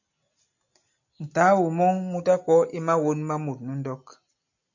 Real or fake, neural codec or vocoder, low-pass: real; none; 7.2 kHz